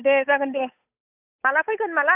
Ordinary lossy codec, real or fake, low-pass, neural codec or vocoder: none; fake; 3.6 kHz; codec, 16 kHz, 8 kbps, FunCodec, trained on Chinese and English, 25 frames a second